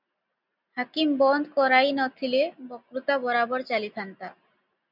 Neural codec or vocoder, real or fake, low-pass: none; real; 5.4 kHz